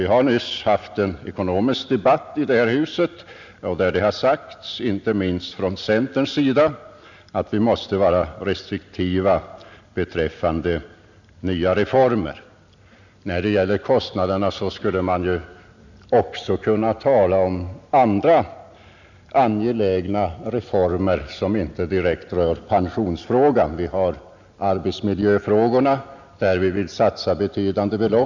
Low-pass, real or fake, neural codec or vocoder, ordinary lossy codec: 7.2 kHz; real; none; none